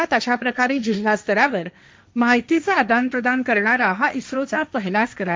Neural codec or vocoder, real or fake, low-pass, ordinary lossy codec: codec, 16 kHz, 1.1 kbps, Voila-Tokenizer; fake; none; none